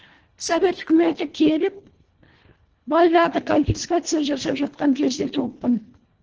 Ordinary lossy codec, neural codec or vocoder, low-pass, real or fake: Opus, 16 kbps; codec, 24 kHz, 1.5 kbps, HILCodec; 7.2 kHz; fake